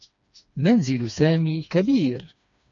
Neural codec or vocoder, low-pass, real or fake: codec, 16 kHz, 2 kbps, FreqCodec, smaller model; 7.2 kHz; fake